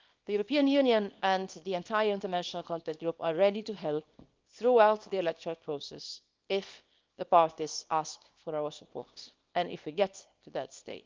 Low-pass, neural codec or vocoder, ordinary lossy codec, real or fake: 7.2 kHz; codec, 24 kHz, 0.9 kbps, WavTokenizer, small release; Opus, 24 kbps; fake